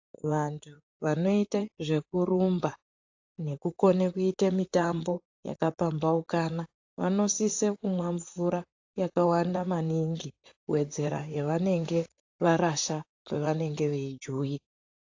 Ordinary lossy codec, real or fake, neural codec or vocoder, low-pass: AAC, 48 kbps; fake; vocoder, 44.1 kHz, 128 mel bands, Pupu-Vocoder; 7.2 kHz